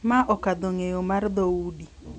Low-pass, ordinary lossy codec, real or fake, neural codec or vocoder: 9.9 kHz; none; real; none